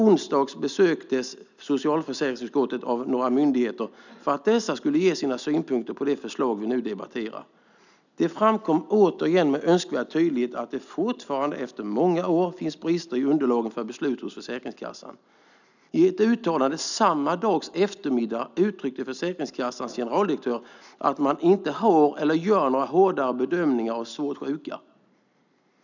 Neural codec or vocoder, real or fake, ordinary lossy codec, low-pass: none; real; none; 7.2 kHz